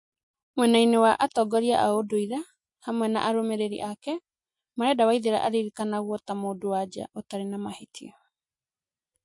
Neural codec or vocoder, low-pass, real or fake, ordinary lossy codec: none; 10.8 kHz; real; MP3, 48 kbps